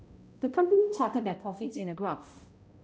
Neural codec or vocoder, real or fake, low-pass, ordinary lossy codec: codec, 16 kHz, 0.5 kbps, X-Codec, HuBERT features, trained on balanced general audio; fake; none; none